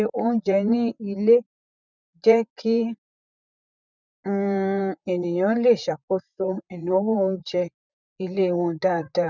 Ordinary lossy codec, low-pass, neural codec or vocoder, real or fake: none; 7.2 kHz; vocoder, 44.1 kHz, 128 mel bands, Pupu-Vocoder; fake